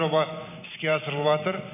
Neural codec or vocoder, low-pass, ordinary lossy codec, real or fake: none; 3.6 kHz; none; real